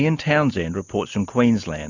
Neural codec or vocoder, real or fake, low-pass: none; real; 7.2 kHz